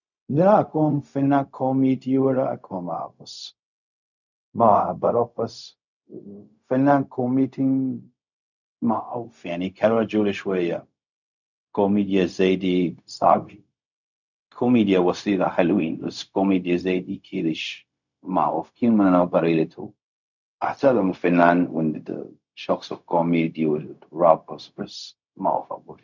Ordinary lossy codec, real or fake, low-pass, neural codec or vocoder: none; fake; 7.2 kHz; codec, 16 kHz, 0.4 kbps, LongCat-Audio-Codec